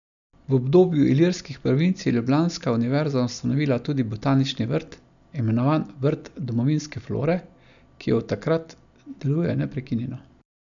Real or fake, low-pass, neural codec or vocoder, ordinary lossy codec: real; 7.2 kHz; none; none